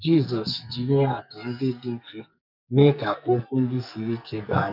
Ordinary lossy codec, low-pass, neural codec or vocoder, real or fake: none; 5.4 kHz; codec, 44.1 kHz, 2.6 kbps, SNAC; fake